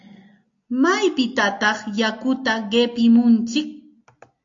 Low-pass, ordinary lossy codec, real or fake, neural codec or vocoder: 7.2 kHz; AAC, 48 kbps; real; none